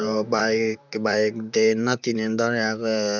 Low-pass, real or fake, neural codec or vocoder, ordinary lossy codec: 7.2 kHz; fake; vocoder, 44.1 kHz, 128 mel bands, Pupu-Vocoder; none